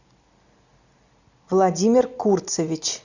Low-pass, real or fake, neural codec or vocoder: 7.2 kHz; real; none